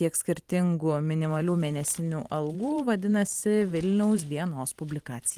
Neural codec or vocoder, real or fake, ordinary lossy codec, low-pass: vocoder, 44.1 kHz, 128 mel bands every 512 samples, BigVGAN v2; fake; Opus, 24 kbps; 14.4 kHz